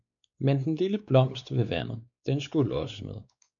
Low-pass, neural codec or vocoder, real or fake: 7.2 kHz; codec, 16 kHz, 4 kbps, X-Codec, WavLM features, trained on Multilingual LibriSpeech; fake